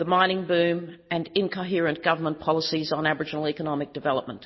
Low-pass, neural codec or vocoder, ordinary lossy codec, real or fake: 7.2 kHz; none; MP3, 24 kbps; real